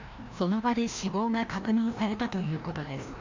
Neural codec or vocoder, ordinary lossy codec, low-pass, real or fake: codec, 16 kHz, 1 kbps, FreqCodec, larger model; MP3, 64 kbps; 7.2 kHz; fake